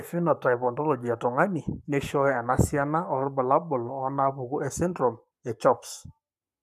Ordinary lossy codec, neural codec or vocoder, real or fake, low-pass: none; codec, 44.1 kHz, 7.8 kbps, Pupu-Codec; fake; 14.4 kHz